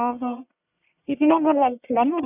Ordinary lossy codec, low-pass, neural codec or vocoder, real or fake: none; 3.6 kHz; codec, 44.1 kHz, 1.7 kbps, Pupu-Codec; fake